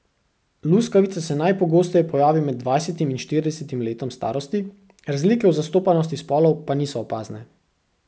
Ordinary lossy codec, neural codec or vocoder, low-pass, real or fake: none; none; none; real